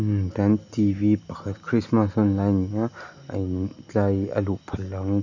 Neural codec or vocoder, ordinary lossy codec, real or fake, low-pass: none; none; real; 7.2 kHz